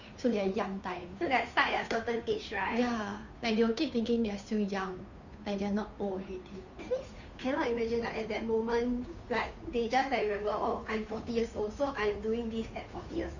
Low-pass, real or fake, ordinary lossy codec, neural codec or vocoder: 7.2 kHz; fake; none; codec, 16 kHz, 2 kbps, FunCodec, trained on Chinese and English, 25 frames a second